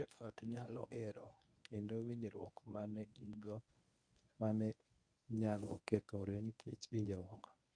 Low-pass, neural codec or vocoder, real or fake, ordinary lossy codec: 9.9 kHz; codec, 24 kHz, 0.9 kbps, WavTokenizer, medium speech release version 1; fake; none